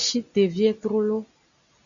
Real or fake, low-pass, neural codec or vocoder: real; 7.2 kHz; none